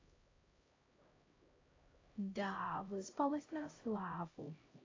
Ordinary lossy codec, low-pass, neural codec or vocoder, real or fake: AAC, 32 kbps; 7.2 kHz; codec, 16 kHz, 0.5 kbps, X-Codec, HuBERT features, trained on LibriSpeech; fake